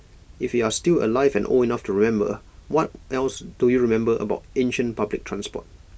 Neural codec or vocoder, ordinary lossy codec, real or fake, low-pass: none; none; real; none